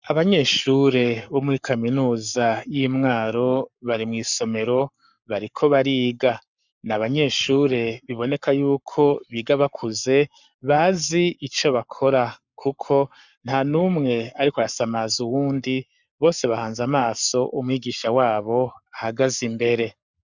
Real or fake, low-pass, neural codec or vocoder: fake; 7.2 kHz; codec, 44.1 kHz, 7.8 kbps, Pupu-Codec